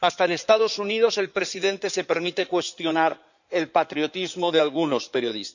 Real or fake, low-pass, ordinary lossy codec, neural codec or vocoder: fake; 7.2 kHz; none; codec, 16 kHz in and 24 kHz out, 2.2 kbps, FireRedTTS-2 codec